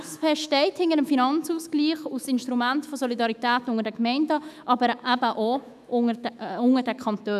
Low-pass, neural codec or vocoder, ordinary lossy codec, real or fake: 14.4 kHz; autoencoder, 48 kHz, 128 numbers a frame, DAC-VAE, trained on Japanese speech; none; fake